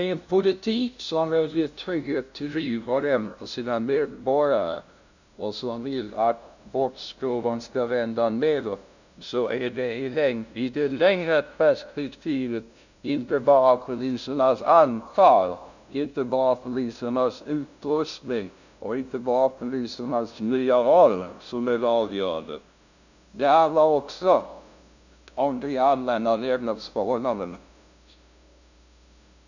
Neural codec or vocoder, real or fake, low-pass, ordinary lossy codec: codec, 16 kHz, 0.5 kbps, FunCodec, trained on LibriTTS, 25 frames a second; fake; 7.2 kHz; none